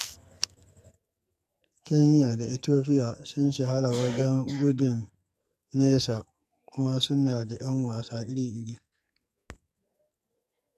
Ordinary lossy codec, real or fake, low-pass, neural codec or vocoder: none; fake; 14.4 kHz; codec, 44.1 kHz, 2.6 kbps, SNAC